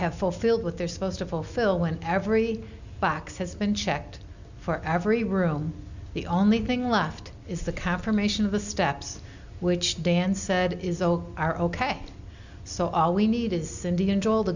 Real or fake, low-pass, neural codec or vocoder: real; 7.2 kHz; none